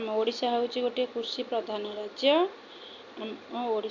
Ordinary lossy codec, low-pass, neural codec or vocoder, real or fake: none; 7.2 kHz; none; real